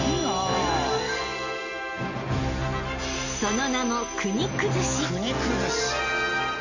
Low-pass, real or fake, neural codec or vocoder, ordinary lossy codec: 7.2 kHz; real; none; none